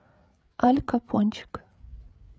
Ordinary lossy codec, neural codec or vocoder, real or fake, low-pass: none; codec, 16 kHz, 8 kbps, FreqCodec, larger model; fake; none